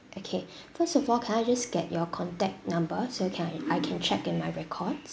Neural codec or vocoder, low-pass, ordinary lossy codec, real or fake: none; none; none; real